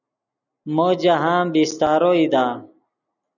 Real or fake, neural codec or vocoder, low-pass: real; none; 7.2 kHz